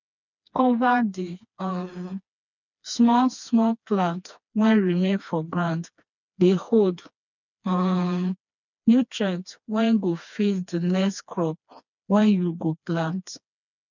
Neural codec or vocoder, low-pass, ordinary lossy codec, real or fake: codec, 16 kHz, 2 kbps, FreqCodec, smaller model; 7.2 kHz; none; fake